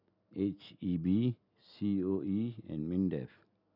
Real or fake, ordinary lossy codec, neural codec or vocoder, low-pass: real; none; none; 5.4 kHz